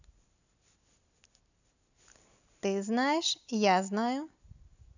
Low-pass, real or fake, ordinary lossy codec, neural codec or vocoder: 7.2 kHz; real; none; none